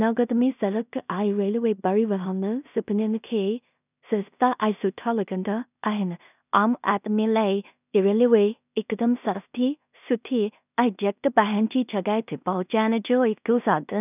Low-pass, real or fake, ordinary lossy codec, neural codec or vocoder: 3.6 kHz; fake; none; codec, 16 kHz in and 24 kHz out, 0.4 kbps, LongCat-Audio-Codec, two codebook decoder